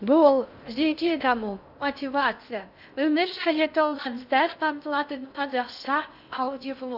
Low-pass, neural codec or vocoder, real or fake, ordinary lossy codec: 5.4 kHz; codec, 16 kHz in and 24 kHz out, 0.6 kbps, FocalCodec, streaming, 2048 codes; fake; AAC, 48 kbps